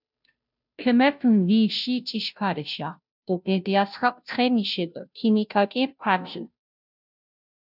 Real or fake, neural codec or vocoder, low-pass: fake; codec, 16 kHz, 0.5 kbps, FunCodec, trained on Chinese and English, 25 frames a second; 5.4 kHz